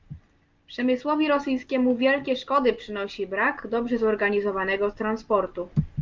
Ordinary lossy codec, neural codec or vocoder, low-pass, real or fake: Opus, 24 kbps; none; 7.2 kHz; real